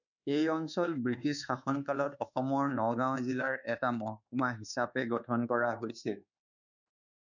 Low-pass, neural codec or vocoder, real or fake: 7.2 kHz; codec, 24 kHz, 1.2 kbps, DualCodec; fake